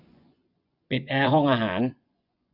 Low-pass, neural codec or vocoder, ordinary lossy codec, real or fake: 5.4 kHz; vocoder, 24 kHz, 100 mel bands, Vocos; none; fake